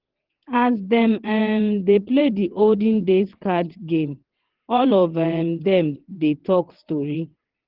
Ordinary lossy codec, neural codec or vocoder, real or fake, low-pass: Opus, 16 kbps; vocoder, 22.05 kHz, 80 mel bands, WaveNeXt; fake; 5.4 kHz